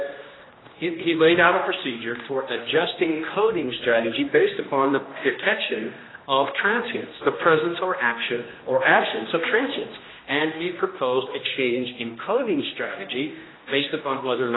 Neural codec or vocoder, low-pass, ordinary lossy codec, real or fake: codec, 16 kHz, 1 kbps, X-Codec, HuBERT features, trained on general audio; 7.2 kHz; AAC, 16 kbps; fake